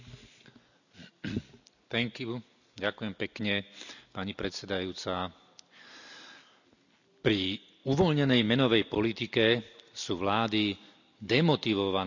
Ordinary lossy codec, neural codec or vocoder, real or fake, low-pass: none; none; real; 7.2 kHz